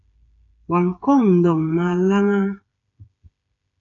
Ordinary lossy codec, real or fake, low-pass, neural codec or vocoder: AAC, 64 kbps; fake; 7.2 kHz; codec, 16 kHz, 8 kbps, FreqCodec, smaller model